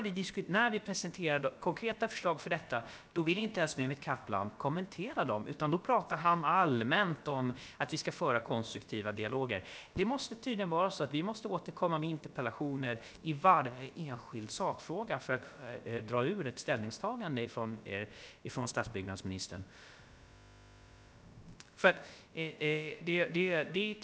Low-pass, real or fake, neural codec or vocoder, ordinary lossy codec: none; fake; codec, 16 kHz, about 1 kbps, DyCAST, with the encoder's durations; none